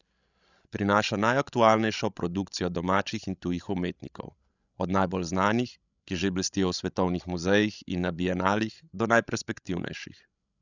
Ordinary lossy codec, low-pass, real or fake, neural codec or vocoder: none; 7.2 kHz; fake; codec, 16 kHz, 16 kbps, FreqCodec, larger model